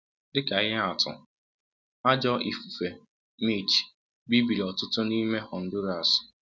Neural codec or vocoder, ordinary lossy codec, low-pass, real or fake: none; none; none; real